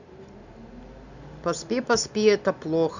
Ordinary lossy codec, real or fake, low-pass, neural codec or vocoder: none; real; 7.2 kHz; none